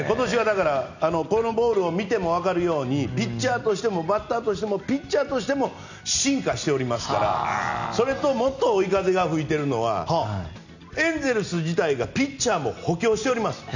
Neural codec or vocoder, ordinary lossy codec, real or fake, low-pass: none; none; real; 7.2 kHz